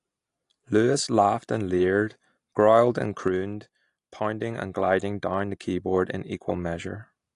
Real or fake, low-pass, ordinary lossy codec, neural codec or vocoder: real; 10.8 kHz; AAC, 48 kbps; none